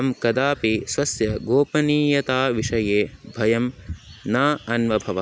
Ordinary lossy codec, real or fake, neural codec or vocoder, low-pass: none; real; none; none